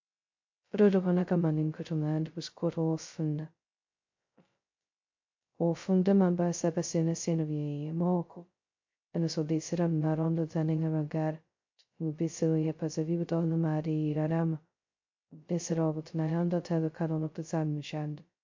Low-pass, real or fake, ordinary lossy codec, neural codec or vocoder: 7.2 kHz; fake; MP3, 48 kbps; codec, 16 kHz, 0.2 kbps, FocalCodec